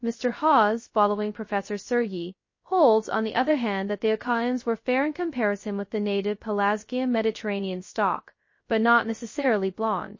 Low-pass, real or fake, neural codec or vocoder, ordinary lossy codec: 7.2 kHz; fake; codec, 16 kHz, 0.2 kbps, FocalCodec; MP3, 32 kbps